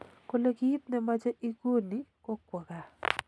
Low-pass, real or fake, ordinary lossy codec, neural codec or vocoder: none; real; none; none